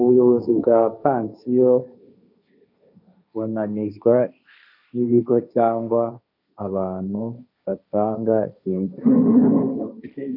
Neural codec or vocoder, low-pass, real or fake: codec, 16 kHz, 1.1 kbps, Voila-Tokenizer; 5.4 kHz; fake